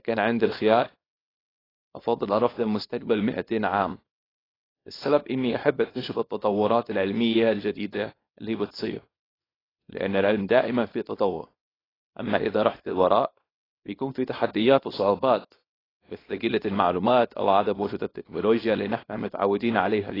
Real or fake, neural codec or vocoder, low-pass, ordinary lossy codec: fake; codec, 24 kHz, 0.9 kbps, WavTokenizer, small release; 5.4 kHz; AAC, 24 kbps